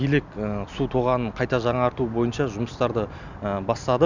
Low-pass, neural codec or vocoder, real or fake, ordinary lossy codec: 7.2 kHz; none; real; none